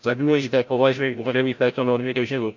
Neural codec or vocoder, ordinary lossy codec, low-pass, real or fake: codec, 16 kHz, 0.5 kbps, FreqCodec, larger model; MP3, 64 kbps; 7.2 kHz; fake